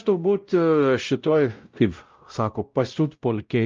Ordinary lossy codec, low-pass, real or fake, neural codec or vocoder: Opus, 24 kbps; 7.2 kHz; fake; codec, 16 kHz, 0.5 kbps, X-Codec, WavLM features, trained on Multilingual LibriSpeech